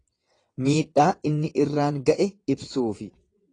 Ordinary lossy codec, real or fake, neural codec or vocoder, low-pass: AAC, 32 kbps; fake; vocoder, 22.05 kHz, 80 mel bands, WaveNeXt; 9.9 kHz